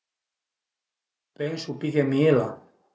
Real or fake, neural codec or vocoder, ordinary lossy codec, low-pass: real; none; none; none